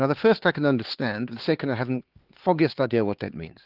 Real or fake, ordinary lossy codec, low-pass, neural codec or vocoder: fake; Opus, 16 kbps; 5.4 kHz; codec, 16 kHz, 4 kbps, X-Codec, HuBERT features, trained on LibriSpeech